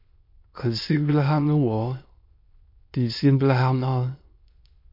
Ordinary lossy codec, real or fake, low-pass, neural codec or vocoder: MP3, 32 kbps; fake; 5.4 kHz; autoencoder, 22.05 kHz, a latent of 192 numbers a frame, VITS, trained on many speakers